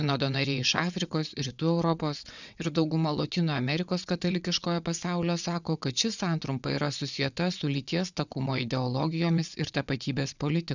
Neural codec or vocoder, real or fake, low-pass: vocoder, 22.05 kHz, 80 mel bands, WaveNeXt; fake; 7.2 kHz